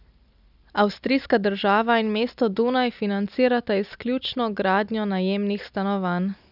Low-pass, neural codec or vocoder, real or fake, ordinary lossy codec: 5.4 kHz; none; real; none